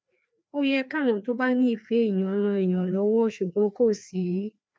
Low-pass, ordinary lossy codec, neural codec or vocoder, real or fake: none; none; codec, 16 kHz, 2 kbps, FreqCodec, larger model; fake